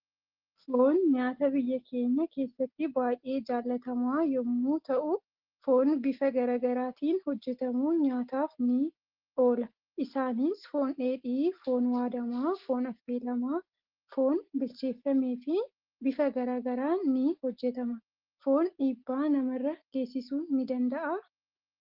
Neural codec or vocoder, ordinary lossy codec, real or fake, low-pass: none; Opus, 16 kbps; real; 5.4 kHz